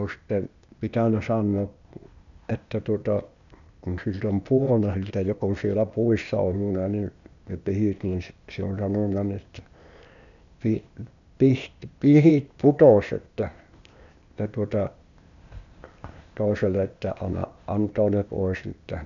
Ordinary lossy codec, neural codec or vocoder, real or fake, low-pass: none; codec, 16 kHz, 0.8 kbps, ZipCodec; fake; 7.2 kHz